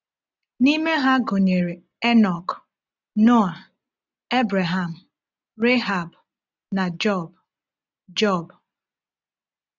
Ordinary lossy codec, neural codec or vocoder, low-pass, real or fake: none; none; 7.2 kHz; real